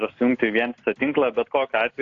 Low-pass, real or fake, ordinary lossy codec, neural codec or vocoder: 7.2 kHz; real; AAC, 48 kbps; none